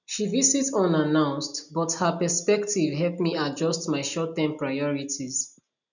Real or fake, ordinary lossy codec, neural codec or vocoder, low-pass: real; none; none; 7.2 kHz